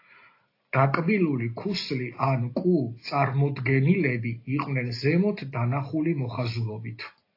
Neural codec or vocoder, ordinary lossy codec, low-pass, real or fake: none; AAC, 32 kbps; 5.4 kHz; real